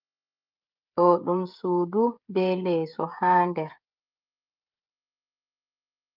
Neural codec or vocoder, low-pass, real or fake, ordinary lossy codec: codec, 16 kHz, 16 kbps, FreqCodec, smaller model; 5.4 kHz; fake; Opus, 24 kbps